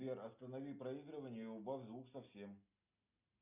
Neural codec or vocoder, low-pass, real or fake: none; 3.6 kHz; real